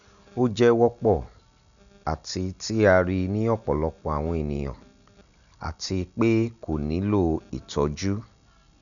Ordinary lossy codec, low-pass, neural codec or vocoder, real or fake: none; 7.2 kHz; none; real